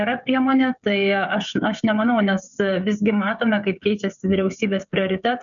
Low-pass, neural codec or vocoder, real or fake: 7.2 kHz; codec, 16 kHz, 16 kbps, FreqCodec, smaller model; fake